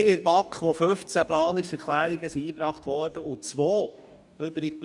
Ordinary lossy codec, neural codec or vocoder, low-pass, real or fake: none; codec, 44.1 kHz, 2.6 kbps, DAC; 10.8 kHz; fake